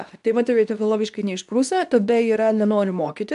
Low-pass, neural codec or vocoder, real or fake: 10.8 kHz; codec, 24 kHz, 0.9 kbps, WavTokenizer, medium speech release version 2; fake